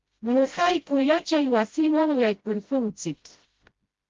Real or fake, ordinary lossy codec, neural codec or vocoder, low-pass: fake; Opus, 32 kbps; codec, 16 kHz, 0.5 kbps, FreqCodec, smaller model; 7.2 kHz